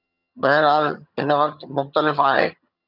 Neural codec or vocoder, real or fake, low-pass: vocoder, 22.05 kHz, 80 mel bands, HiFi-GAN; fake; 5.4 kHz